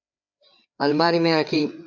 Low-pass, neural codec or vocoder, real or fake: 7.2 kHz; codec, 16 kHz, 4 kbps, FreqCodec, larger model; fake